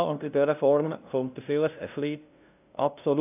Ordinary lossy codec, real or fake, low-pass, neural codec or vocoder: none; fake; 3.6 kHz; codec, 16 kHz, 0.5 kbps, FunCodec, trained on LibriTTS, 25 frames a second